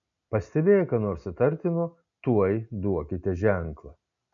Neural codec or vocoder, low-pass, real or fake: none; 7.2 kHz; real